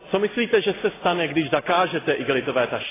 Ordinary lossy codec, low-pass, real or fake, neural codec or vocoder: AAC, 16 kbps; 3.6 kHz; real; none